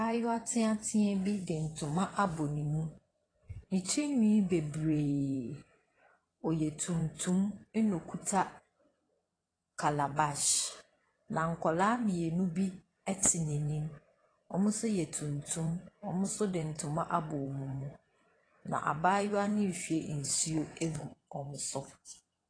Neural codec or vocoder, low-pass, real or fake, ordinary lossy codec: vocoder, 22.05 kHz, 80 mel bands, WaveNeXt; 9.9 kHz; fake; AAC, 32 kbps